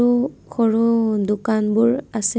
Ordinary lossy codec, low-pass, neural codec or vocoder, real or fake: none; none; none; real